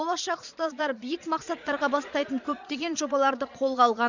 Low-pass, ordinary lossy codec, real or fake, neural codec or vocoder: 7.2 kHz; none; fake; vocoder, 22.05 kHz, 80 mel bands, Vocos